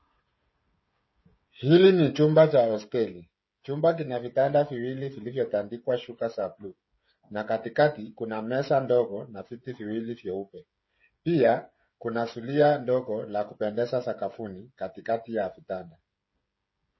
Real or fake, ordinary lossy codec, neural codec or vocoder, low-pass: fake; MP3, 24 kbps; codec, 16 kHz, 16 kbps, FreqCodec, smaller model; 7.2 kHz